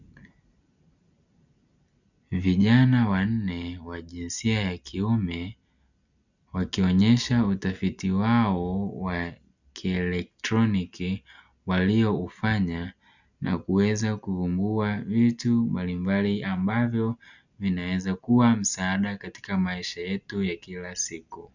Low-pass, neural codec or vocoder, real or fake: 7.2 kHz; none; real